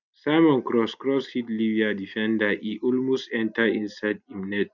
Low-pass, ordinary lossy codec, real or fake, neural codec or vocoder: 7.2 kHz; none; real; none